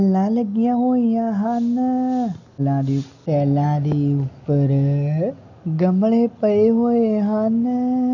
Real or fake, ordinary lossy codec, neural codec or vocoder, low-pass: real; none; none; 7.2 kHz